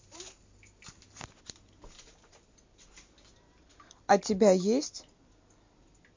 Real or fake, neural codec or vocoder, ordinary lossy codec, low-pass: real; none; MP3, 48 kbps; 7.2 kHz